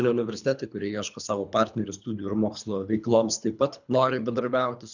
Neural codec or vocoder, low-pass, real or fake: codec, 24 kHz, 3 kbps, HILCodec; 7.2 kHz; fake